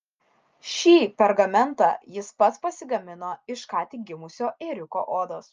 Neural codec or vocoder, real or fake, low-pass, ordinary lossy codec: none; real; 7.2 kHz; Opus, 24 kbps